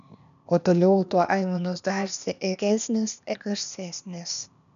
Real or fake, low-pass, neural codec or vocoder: fake; 7.2 kHz; codec, 16 kHz, 0.8 kbps, ZipCodec